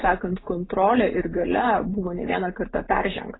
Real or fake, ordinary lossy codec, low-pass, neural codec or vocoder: real; AAC, 16 kbps; 7.2 kHz; none